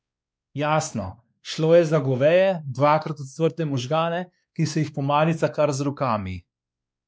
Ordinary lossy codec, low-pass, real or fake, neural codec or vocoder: none; none; fake; codec, 16 kHz, 2 kbps, X-Codec, WavLM features, trained on Multilingual LibriSpeech